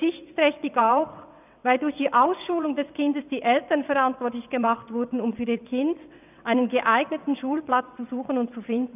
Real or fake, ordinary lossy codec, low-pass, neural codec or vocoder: fake; none; 3.6 kHz; vocoder, 22.05 kHz, 80 mel bands, WaveNeXt